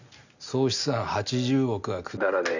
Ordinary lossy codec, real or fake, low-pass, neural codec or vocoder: none; real; 7.2 kHz; none